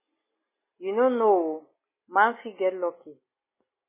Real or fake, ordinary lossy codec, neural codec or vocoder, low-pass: real; MP3, 16 kbps; none; 3.6 kHz